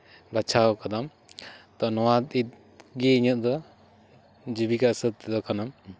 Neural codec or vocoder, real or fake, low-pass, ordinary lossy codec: none; real; none; none